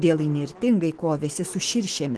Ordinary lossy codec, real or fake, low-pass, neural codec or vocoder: Opus, 16 kbps; fake; 10.8 kHz; autoencoder, 48 kHz, 128 numbers a frame, DAC-VAE, trained on Japanese speech